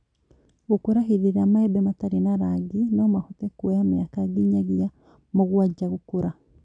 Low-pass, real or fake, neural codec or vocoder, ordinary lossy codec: 9.9 kHz; real; none; none